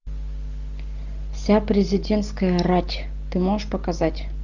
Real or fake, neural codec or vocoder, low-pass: real; none; 7.2 kHz